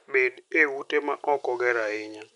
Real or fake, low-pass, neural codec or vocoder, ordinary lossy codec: real; 10.8 kHz; none; none